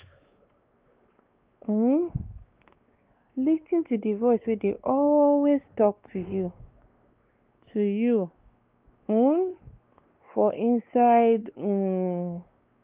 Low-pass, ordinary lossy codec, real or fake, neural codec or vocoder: 3.6 kHz; Opus, 24 kbps; fake; codec, 16 kHz, 2 kbps, X-Codec, WavLM features, trained on Multilingual LibriSpeech